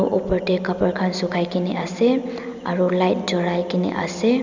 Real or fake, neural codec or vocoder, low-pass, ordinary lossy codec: real; none; 7.2 kHz; none